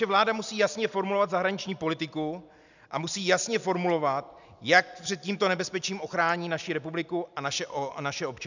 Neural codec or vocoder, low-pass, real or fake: none; 7.2 kHz; real